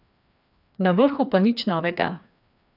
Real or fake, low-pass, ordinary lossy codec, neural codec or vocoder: fake; 5.4 kHz; none; codec, 16 kHz, 2 kbps, FreqCodec, larger model